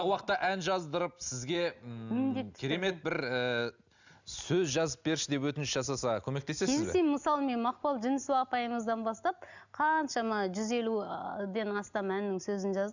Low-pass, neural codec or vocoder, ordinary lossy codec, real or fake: 7.2 kHz; none; none; real